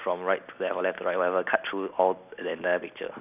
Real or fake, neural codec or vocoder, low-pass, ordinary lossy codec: real; none; 3.6 kHz; none